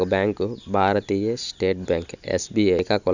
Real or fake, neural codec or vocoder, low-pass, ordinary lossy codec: real; none; 7.2 kHz; none